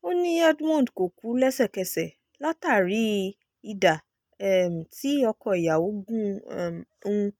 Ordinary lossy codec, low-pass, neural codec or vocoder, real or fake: none; 19.8 kHz; none; real